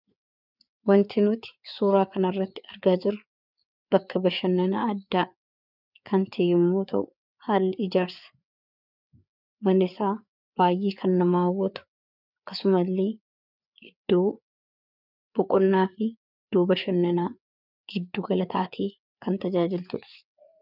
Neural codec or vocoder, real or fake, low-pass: codec, 16 kHz, 4 kbps, FreqCodec, larger model; fake; 5.4 kHz